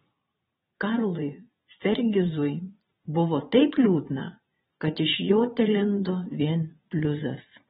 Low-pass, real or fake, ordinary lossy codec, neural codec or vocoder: 9.9 kHz; fake; AAC, 16 kbps; vocoder, 22.05 kHz, 80 mel bands, Vocos